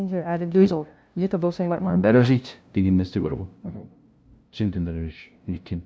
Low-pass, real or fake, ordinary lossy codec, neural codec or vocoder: none; fake; none; codec, 16 kHz, 0.5 kbps, FunCodec, trained on LibriTTS, 25 frames a second